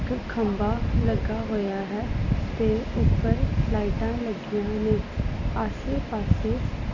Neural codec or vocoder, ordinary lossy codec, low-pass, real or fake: none; none; 7.2 kHz; real